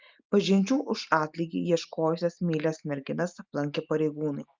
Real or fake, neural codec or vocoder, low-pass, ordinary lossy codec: real; none; 7.2 kHz; Opus, 24 kbps